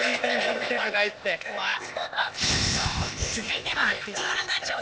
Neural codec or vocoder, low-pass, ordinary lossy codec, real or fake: codec, 16 kHz, 0.8 kbps, ZipCodec; none; none; fake